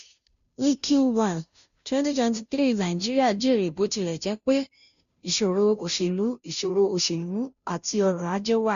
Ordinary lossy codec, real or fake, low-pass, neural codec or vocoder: none; fake; 7.2 kHz; codec, 16 kHz, 0.5 kbps, FunCodec, trained on Chinese and English, 25 frames a second